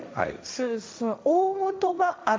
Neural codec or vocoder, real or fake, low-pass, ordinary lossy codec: codec, 16 kHz, 1.1 kbps, Voila-Tokenizer; fake; none; none